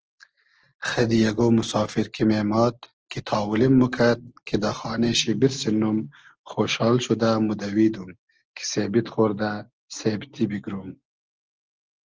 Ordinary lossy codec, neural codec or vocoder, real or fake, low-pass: Opus, 16 kbps; none; real; 7.2 kHz